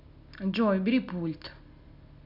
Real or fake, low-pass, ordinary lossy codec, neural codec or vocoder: real; 5.4 kHz; none; none